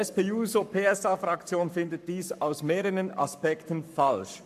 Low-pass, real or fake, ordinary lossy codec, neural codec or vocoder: 14.4 kHz; fake; MP3, 96 kbps; codec, 44.1 kHz, 7.8 kbps, Pupu-Codec